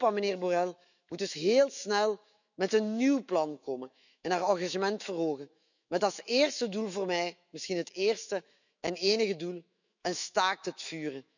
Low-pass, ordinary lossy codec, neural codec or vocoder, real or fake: 7.2 kHz; none; autoencoder, 48 kHz, 128 numbers a frame, DAC-VAE, trained on Japanese speech; fake